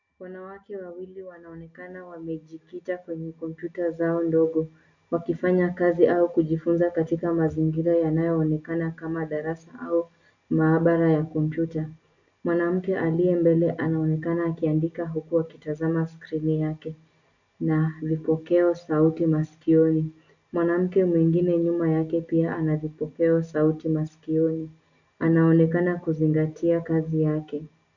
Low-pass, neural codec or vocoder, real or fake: 7.2 kHz; none; real